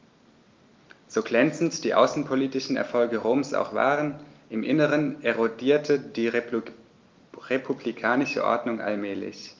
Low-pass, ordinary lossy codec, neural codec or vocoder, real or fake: 7.2 kHz; Opus, 24 kbps; none; real